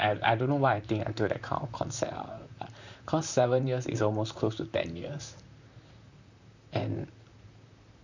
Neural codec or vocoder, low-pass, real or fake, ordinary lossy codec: vocoder, 44.1 kHz, 128 mel bands, Pupu-Vocoder; 7.2 kHz; fake; none